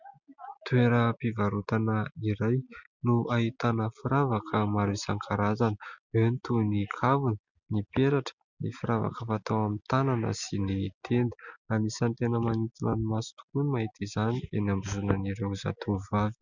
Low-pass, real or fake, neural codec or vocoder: 7.2 kHz; real; none